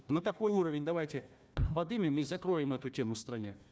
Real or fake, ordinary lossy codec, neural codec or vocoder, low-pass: fake; none; codec, 16 kHz, 1 kbps, FunCodec, trained on Chinese and English, 50 frames a second; none